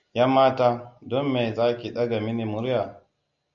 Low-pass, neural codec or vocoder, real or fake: 7.2 kHz; none; real